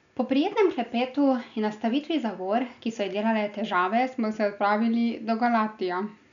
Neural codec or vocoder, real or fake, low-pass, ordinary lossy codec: none; real; 7.2 kHz; MP3, 96 kbps